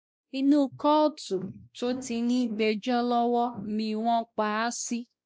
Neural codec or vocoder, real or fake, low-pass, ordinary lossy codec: codec, 16 kHz, 1 kbps, X-Codec, WavLM features, trained on Multilingual LibriSpeech; fake; none; none